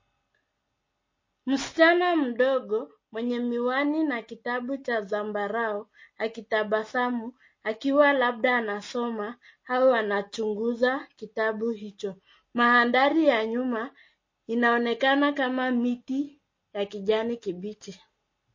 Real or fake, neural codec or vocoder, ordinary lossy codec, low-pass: real; none; MP3, 32 kbps; 7.2 kHz